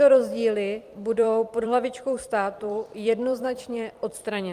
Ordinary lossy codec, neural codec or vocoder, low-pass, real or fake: Opus, 32 kbps; vocoder, 44.1 kHz, 128 mel bands every 256 samples, BigVGAN v2; 14.4 kHz; fake